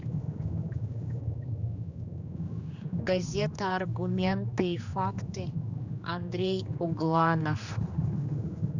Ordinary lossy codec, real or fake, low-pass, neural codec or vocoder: none; fake; 7.2 kHz; codec, 16 kHz, 2 kbps, X-Codec, HuBERT features, trained on general audio